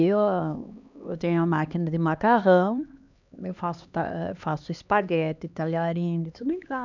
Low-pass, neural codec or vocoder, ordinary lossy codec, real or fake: 7.2 kHz; codec, 16 kHz, 2 kbps, X-Codec, HuBERT features, trained on LibriSpeech; none; fake